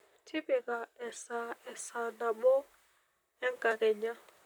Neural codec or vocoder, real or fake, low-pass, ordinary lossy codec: vocoder, 44.1 kHz, 128 mel bands, Pupu-Vocoder; fake; none; none